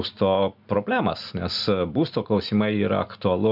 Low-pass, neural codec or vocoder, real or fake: 5.4 kHz; none; real